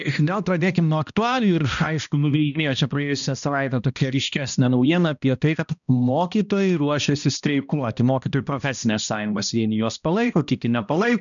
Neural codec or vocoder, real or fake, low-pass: codec, 16 kHz, 1 kbps, X-Codec, HuBERT features, trained on balanced general audio; fake; 7.2 kHz